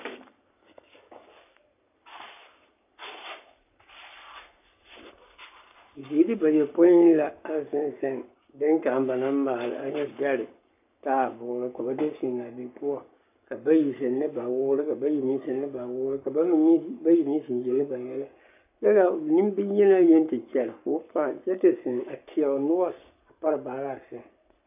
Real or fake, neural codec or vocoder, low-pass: fake; codec, 44.1 kHz, 7.8 kbps, Pupu-Codec; 3.6 kHz